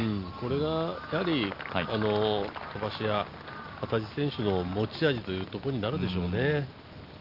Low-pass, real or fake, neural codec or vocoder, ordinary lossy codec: 5.4 kHz; real; none; Opus, 32 kbps